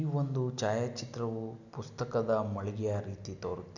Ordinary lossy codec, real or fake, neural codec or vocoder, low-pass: none; real; none; 7.2 kHz